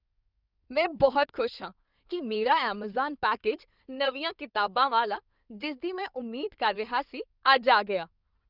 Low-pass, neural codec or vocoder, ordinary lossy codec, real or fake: 5.4 kHz; codec, 16 kHz in and 24 kHz out, 2.2 kbps, FireRedTTS-2 codec; none; fake